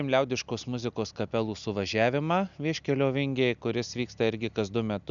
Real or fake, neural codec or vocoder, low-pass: real; none; 7.2 kHz